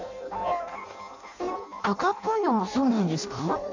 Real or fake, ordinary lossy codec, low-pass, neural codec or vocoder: fake; MP3, 64 kbps; 7.2 kHz; codec, 16 kHz in and 24 kHz out, 0.6 kbps, FireRedTTS-2 codec